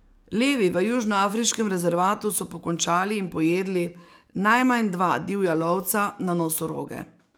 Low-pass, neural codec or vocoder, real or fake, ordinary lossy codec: none; codec, 44.1 kHz, 7.8 kbps, DAC; fake; none